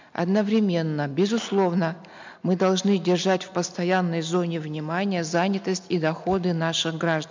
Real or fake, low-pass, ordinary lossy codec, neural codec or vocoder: real; 7.2 kHz; MP3, 64 kbps; none